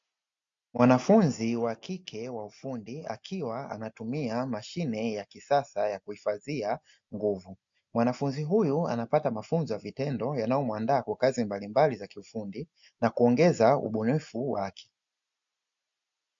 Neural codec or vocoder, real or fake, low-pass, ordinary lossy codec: none; real; 7.2 kHz; MP3, 64 kbps